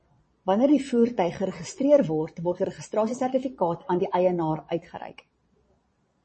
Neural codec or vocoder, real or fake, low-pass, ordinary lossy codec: vocoder, 22.05 kHz, 80 mel bands, Vocos; fake; 9.9 kHz; MP3, 32 kbps